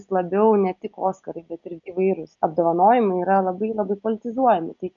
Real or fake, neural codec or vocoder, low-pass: real; none; 7.2 kHz